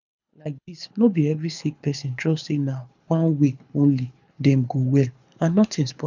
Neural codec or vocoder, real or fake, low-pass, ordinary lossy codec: codec, 24 kHz, 6 kbps, HILCodec; fake; 7.2 kHz; none